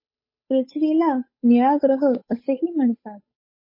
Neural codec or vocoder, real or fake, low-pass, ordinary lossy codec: codec, 16 kHz, 8 kbps, FunCodec, trained on Chinese and English, 25 frames a second; fake; 5.4 kHz; MP3, 24 kbps